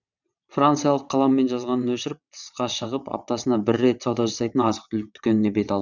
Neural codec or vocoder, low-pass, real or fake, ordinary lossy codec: vocoder, 22.05 kHz, 80 mel bands, WaveNeXt; 7.2 kHz; fake; none